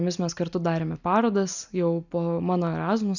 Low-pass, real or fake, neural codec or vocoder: 7.2 kHz; real; none